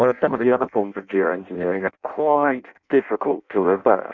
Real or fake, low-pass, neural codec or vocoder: fake; 7.2 kHz; codec, 16 kHz in and 24 kHz out, 0.6 kbps, FireRedTTS-2 codec